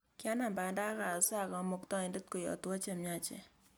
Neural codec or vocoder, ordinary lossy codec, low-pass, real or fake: none; none; none; real